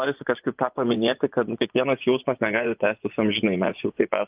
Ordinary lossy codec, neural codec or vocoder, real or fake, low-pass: Opus, 24 kbps; vocoder, 24 kHz, 100 mel bands, Vocos; fake; 3.6 kHz